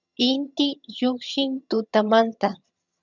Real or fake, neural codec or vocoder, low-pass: fake; vocoder, 22.05 kHz, 80 mel bands, HiFi-GAN; 7.2 kHz